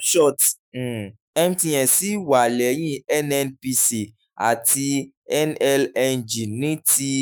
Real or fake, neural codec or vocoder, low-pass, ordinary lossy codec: fake; autoencoder, 48 kHz, 128 numbers a frame, DAC-VAE, trained on Japanese speech; none; none